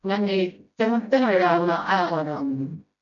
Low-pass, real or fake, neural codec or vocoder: 7.2 kHz; fake; codec, 16 kHz, 0.5 kbps, FreqCodec, smaller model